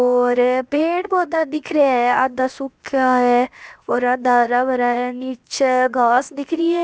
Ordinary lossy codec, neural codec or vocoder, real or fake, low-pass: none; codec, 16 kHz, 0.7 kbps, FocalCodec; fake; none